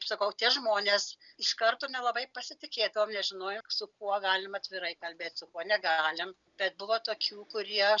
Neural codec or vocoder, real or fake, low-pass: none; real; 7.2 kHz